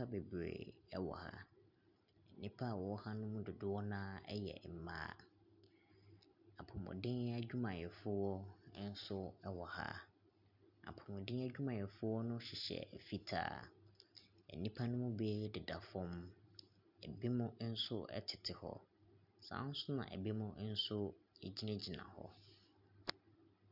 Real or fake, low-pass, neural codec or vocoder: real; 5.4 kHz; none